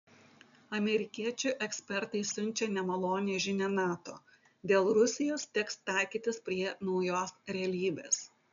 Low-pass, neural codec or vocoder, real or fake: 7.2 kHz; none; real